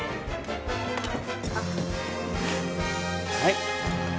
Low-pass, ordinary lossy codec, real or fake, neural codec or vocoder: none; none; real; none